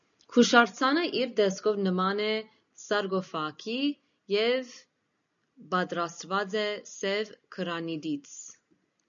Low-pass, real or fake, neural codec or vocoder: 7.2 kHz; real; none